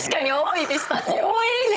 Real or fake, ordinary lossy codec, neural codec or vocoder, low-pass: fake; none; codec, 16 kHz, 16 kbps, FunCodec, trained on Chinese and English, 50 frames a second; none